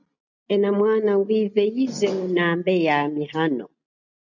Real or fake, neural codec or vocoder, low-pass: real; none; 7.2 kHz